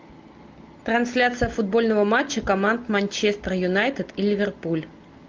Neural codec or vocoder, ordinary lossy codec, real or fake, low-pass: none; Opus, 32 kbps; real; 7.2 kHz